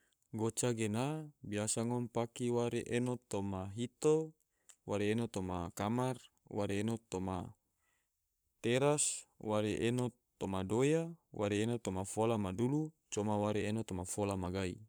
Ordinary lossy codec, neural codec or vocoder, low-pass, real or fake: none; codec, 44.1 kHz, 7.8 kbps, Pupu-Codec; none; fake